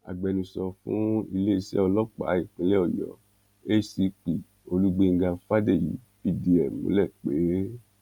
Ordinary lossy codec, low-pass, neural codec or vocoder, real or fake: none; 19.8 kHz; none; real